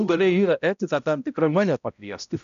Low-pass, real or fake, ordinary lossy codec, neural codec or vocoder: 7.2 kHz; fake; MP3, 96 kbps; codec, 16 kHz, 0.5 kbps, X-Codec, HuBERT features, trained on balanced general audio